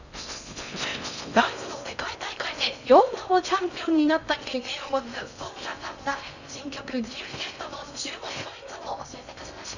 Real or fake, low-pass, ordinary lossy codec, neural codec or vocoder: fake; 7.2 kHz; none; codec, 16 kHz in and 24 kHz out, 0.6 kbps, FocalCodec, streaming, 4096 codes